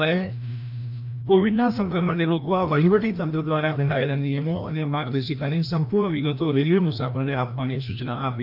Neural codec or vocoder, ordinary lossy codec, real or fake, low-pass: codec, 16 kHz, 1 kbps, FreqCodec, larger model; AAC, 48 kbps; fake; 5.4 kHz